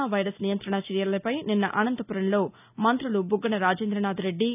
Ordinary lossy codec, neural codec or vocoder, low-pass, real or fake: none; none; 3.6 kHz; real